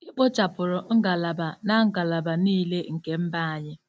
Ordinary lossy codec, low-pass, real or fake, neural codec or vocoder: none; none; real; none